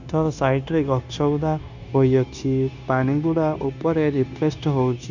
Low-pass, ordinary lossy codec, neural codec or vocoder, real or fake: 7.2 kHz; none; codec, 16 kHz, 0.9 kbps, LongCat-Audio-Codec; fake